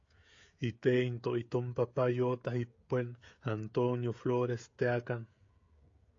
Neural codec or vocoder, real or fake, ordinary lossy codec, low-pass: codec, 16 kHz, 16 kbps, FreqCodec, smaller model; fake; AAC, 48 kbps; 7.2 kHz